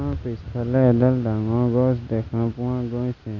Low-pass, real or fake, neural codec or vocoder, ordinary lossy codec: 7.2 kHz; real; none; none